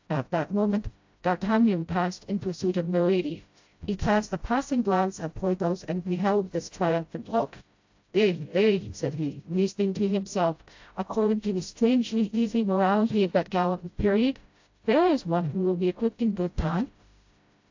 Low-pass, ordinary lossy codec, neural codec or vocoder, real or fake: 7.2 kHz; AAC, 48 kbps; codec, 16 kHz, 0.5 kbps, FreqCodec, smaller model; fake